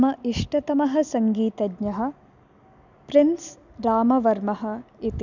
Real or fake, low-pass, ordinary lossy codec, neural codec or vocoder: real; 7.2 kHz; none; none